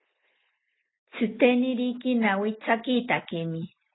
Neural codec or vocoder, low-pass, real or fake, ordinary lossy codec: none; 7.2 kHz; real; AAC, 16 kbps